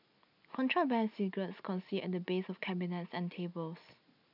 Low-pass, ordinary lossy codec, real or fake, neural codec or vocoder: 5.4 kHz; none; real; none